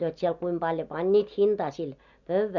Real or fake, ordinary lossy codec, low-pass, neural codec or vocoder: real; none; 7.2 kHz; none